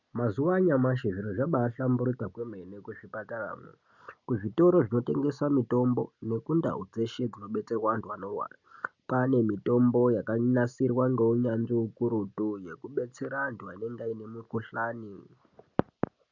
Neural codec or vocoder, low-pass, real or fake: none; 7.2 kHz; real